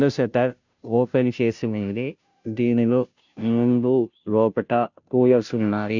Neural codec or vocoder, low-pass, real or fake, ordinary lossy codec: codec, 16 kHz, 0.5 kbps, FunCodec, trained on Chinese and English, 25 frames a second; 7.2 kHz; fake; none